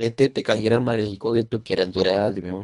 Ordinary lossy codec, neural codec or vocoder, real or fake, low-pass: none; codec, 24 kHz, 1.5 kbps, HILCodec; fake; 10.8 kHz